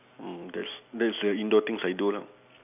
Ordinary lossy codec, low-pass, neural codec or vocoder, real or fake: none; 3.6 kHz; none; real